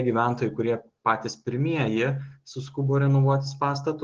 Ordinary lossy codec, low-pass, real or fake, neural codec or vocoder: Opus, 16 kbps; 7.2 kHz; real; none